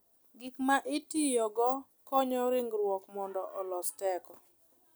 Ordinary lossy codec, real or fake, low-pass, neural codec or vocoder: none; real; none; none